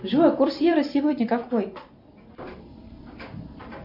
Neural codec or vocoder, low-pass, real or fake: vocoder, 44.1 kHz, 128 mel bands every 256 samples, BigVGAN v2; 5.4 kHz; fake